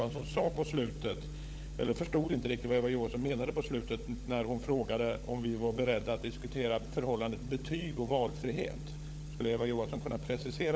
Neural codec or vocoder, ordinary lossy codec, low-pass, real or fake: codec, 16 kHz, 16 kbps, FunCodec, trained on LibriTTS, 50 frames a second; none; none; fake